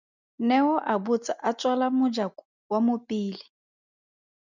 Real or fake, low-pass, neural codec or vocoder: real; 7.2 kHz; none